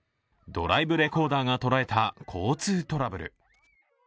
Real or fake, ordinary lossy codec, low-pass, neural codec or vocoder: real; none; none; none